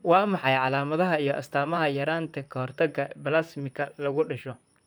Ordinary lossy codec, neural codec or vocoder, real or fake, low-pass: none; vocoder, 44.1 kHz, 128 mel bands, Pupu-Vocoder; fake; none